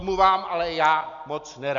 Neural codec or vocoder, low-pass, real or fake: none; 7.2 kHz; real